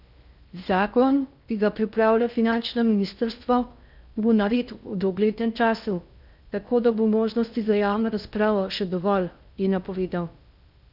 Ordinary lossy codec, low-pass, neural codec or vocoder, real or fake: none; 5.4 kHz; codec, 16 kHz in and 24 kHz out, 0.6 kbps, FocalCodec, streaming, 4096 codes; fake